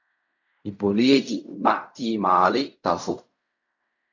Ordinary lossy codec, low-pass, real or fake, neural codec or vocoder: AAC, 48 kbps; 7.2 kHz; fake; codec, 16 kHz in and 24 kHz out, 0.4 kbps, LongCat-Audio-Codec, fine tuned four codebook decoder